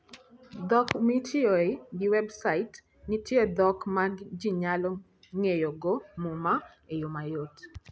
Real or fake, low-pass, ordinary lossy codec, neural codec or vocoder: real; none; none; none